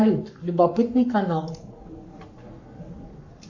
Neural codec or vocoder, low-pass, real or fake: codec, 44.1 kHz, 7.8 kbps, Pupu-Codec; 7.2 kHz; fake